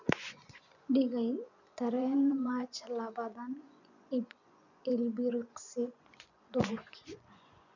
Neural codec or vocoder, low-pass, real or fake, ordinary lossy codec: vocoder, 24 kHz, 100 mel bands, Vocos; 7.2 kHz; fake; none